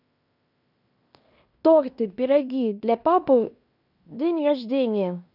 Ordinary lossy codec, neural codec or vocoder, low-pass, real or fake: none; codec, 16 kHz in and 24 kHz out, 0.9 kbps, LongCat-Audio-Codec, fine tuned four codebook decoder; 5.4 kHz; fake